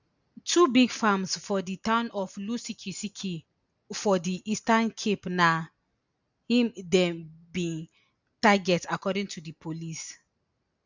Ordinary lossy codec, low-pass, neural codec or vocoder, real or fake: none; 7.2 kHz; none; real